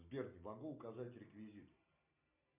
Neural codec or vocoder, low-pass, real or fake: none; 3.6 kHz; real